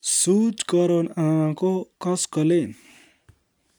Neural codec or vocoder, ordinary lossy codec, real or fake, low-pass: none; none; real; none